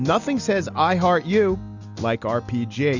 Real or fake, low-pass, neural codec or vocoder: real; 7.2 kHz; none